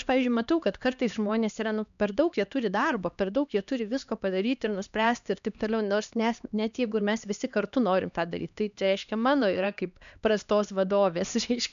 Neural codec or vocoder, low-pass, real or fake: codec, 16 kHz, 2 kbps, X-Codec, HuBERT features, trained on LibriSpeech; 7.2 kHz; fake